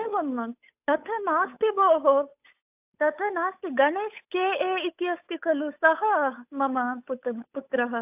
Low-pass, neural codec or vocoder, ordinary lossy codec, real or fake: 3.6 kHz; codec, 16 kHz, 8 kbps, FunCodec, trained on Chinese and English, 25 frames a second; AAC, 32 kbps; fake